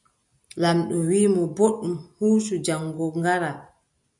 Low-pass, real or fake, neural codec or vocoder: 10.8 kHz; real; none